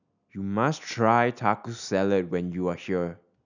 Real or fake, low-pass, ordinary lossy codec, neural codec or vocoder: real; 7.2 kHz; none; none